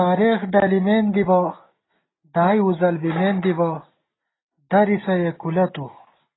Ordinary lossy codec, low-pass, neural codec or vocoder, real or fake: AAC, 16 kbps; 7.2 kHz; none; real